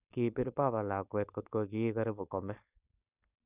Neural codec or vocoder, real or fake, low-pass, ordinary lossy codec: codec, 16 kHz, 4.8 kbps, FACodec; fake; 3.6 kHz; none